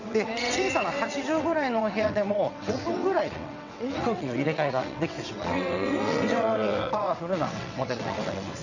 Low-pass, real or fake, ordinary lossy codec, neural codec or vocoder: 7.2 kHz; fake; none; vocoder, 22.05 kHz, 80 mel bands, WaveNeXt